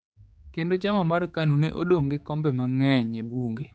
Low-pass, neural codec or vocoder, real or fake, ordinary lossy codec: none; codec, 16 kHz, 4 kbps, X-Codec, HuBERT features, trained on general audio; fake; none